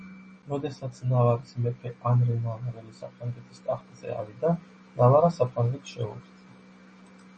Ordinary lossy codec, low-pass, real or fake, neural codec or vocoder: MP3, 32 kbps; 10.8 kHz; real; none